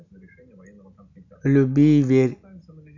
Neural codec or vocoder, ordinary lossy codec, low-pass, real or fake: none; none; 7.2 kHz; real